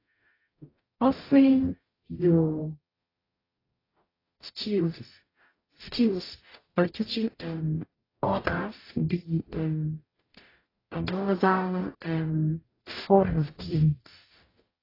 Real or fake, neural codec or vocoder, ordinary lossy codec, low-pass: fake; codec, 44.1 kHz, 0.9 kbps, DAC; AAC, 24 kbps; 5.4 kHz